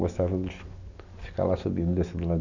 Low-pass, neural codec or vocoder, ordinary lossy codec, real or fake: 7.2 kHz; none; none; real